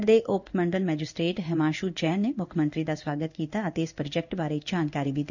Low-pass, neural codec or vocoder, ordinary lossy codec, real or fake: 7.2 kHz; codec, 16 kHz in and 24 kHz out, 1 kbps, XY-Tokenizer; none; fake